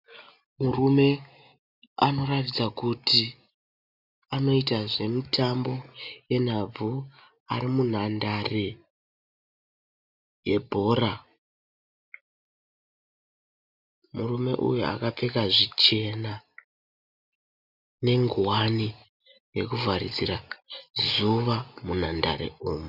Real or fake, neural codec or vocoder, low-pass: real; none; 5.4 kHz